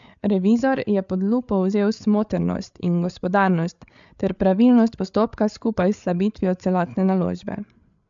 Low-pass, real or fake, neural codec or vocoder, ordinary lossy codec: 7.2 kHz; fake; codec, 16 kHz, 8 kbps, FreqCodec, larger model; MP3, 64 kbps